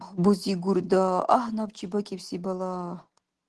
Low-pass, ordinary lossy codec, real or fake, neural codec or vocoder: 10.8 kHz; Opus, 16 kbps; real; none